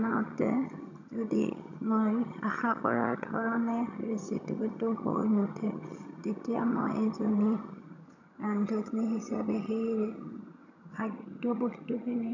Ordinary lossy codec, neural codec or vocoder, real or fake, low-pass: none; vocoder, 22.05 kHz, 80 mel bands, HiFi-GAN; fake; 7.2 kHz